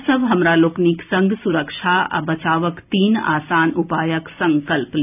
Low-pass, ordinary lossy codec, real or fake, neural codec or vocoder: 3.6 kHz; none; real; none